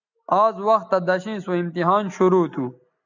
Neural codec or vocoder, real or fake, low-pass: none; real; 7.2 kHz